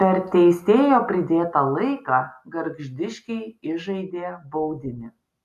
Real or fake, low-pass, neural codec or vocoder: real; 14.4 kHz; none